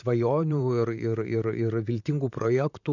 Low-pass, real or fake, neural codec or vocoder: 7.2 kHz; fake; vocoder, 44.1 kHz, 128 mel bands, Pupu-Vocoder